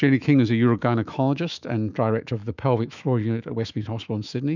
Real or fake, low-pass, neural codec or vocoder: fake; 7.2 kHz; autoencoder, 48 kHz, 128 numbers a frame, DAC-VAE, trained on Japanese speech